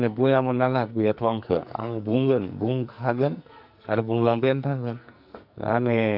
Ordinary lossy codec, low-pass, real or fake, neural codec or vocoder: none; 5.4 kHz; fake; codec, 44.1 kHz, 2.6 kbps, SNAC